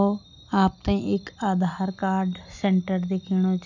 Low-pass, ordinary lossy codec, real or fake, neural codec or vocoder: 7.2 kHz; none; real; none